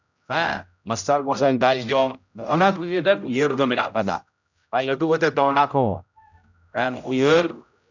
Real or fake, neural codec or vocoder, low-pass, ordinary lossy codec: fake; codec, 16 kHz, 0.5 kbps, X-Codec, HuBERT features, trained on general audio; 7.2 kHz; none